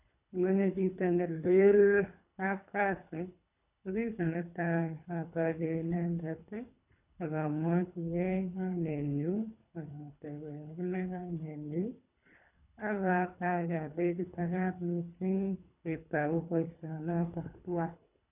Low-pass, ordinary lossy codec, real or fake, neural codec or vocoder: 3.6 kHz; none; fake; codec, 24 kHz, 3 kbps, HILCodec